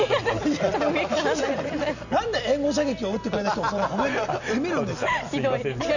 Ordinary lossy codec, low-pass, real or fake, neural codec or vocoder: none; 7.2 kHz; real; none